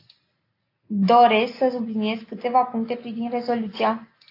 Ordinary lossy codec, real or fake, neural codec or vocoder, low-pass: AAC, 32 kbps; real; none; 5.4 kHz